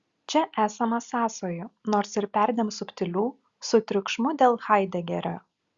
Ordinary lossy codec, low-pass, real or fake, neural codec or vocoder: Opus, 64 kbps; 7.2 kHz; real; none